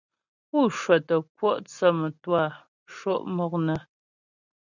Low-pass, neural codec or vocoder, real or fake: 7.2 kHz; none; real